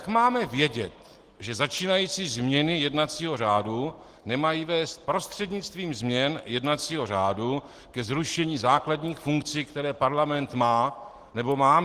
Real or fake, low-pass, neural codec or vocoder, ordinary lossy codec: real; 14.4 kHz; none; Opus, 16 kbps